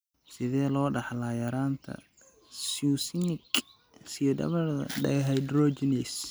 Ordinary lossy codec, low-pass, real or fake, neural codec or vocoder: none; none; real; none